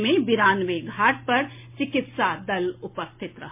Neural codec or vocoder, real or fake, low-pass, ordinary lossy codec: none; real; 3.6 kHz; MP3, 24 kbps